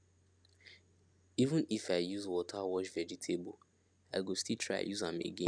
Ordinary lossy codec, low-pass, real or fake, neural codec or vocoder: none; 9.9 kHz; real; none